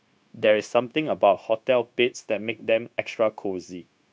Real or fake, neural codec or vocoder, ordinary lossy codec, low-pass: fake; codec, 16 kHz, 0.9 kbps, LongCat-Audio-Codec; none; none